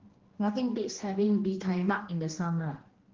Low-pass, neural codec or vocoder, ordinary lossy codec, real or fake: 7.2 kHz; codec, 16 kHz, 1 kbps, X-Codec, HuBERT features, trained on general audio; Opus, 16 kbps; fake